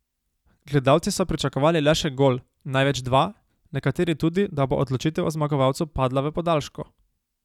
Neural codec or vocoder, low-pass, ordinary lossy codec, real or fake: none; 19.8 kHz; none; real